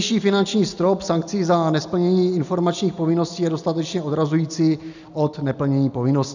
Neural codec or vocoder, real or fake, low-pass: none; real; 7.2 kHz